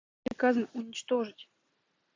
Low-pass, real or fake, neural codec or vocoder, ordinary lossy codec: 7.2 kHz; real; none; AAC, 32 kbps